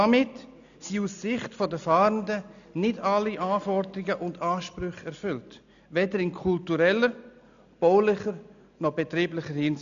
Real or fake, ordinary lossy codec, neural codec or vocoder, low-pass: real; none; none; 7.2 kHz